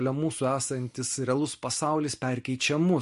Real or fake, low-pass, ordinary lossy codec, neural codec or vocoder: real; 14.4 kHz; MP3, 48 kbps; none